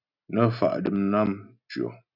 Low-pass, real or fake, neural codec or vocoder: 5.4 kHz; real; none